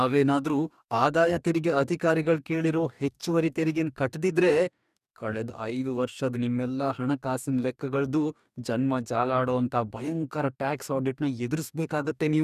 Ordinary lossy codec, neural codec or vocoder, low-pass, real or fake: none; codec, 44.1 kHz, 2.6 kbps, DAC; 14.4 kHz; fake